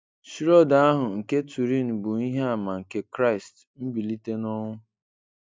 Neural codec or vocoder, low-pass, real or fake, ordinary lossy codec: none; none; real; none